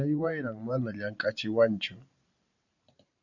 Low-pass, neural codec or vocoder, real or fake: 7.2 kHz; vocoder, 44.1 kHz, 128 mel bands every 512 samples, BigVGAN v2; fake